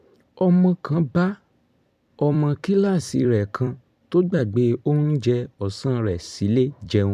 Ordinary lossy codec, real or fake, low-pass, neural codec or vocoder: none; fake; 14.4 kHz; vocoder, 44.1 kHz, 128 mel bands every 256 samples, BigVGAN v2